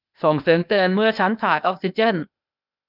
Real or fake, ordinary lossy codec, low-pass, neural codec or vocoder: fake; none; 5.4 kHz; codec, 16 kHz, 0.8 kbps, ZipCodec